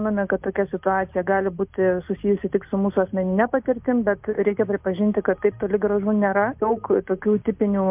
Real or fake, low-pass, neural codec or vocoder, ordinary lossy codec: real; 3.6 kHz; none; MP3, 32 kbps